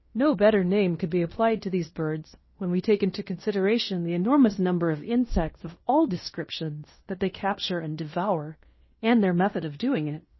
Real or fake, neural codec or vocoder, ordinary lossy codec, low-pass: fake; codec, 16 kHz in and 24 kHz out, 0.9 kbps, LongCat-Audio-Codec, fine tuned four codebook decoder; MP3, 24 kbps; 7.2 kHz